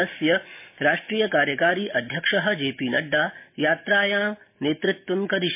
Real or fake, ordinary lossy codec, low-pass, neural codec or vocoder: real; MP3, 24 kbps; 3.6 kHz; none